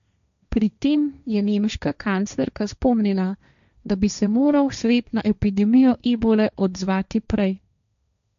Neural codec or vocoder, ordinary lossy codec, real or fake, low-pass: codec, 16 kHz, 1.1 kbps, Voila-Tokenizer; none; fake; 7.2 kHz